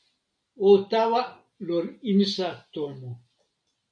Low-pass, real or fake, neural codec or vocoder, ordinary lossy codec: 9.9 kHz; real; none; MP3, 48 kbps